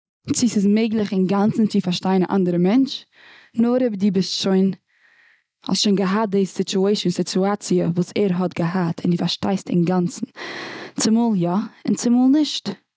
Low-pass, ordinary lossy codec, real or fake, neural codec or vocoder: none; none; real; none